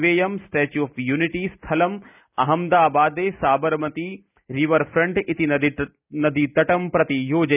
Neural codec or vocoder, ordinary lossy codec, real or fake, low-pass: none; none; real; 3.6 kHz